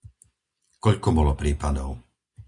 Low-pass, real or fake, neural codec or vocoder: 10.8 kHz; real; none